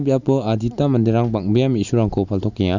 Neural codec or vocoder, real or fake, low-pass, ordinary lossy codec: none; real; 7.2 kHz; none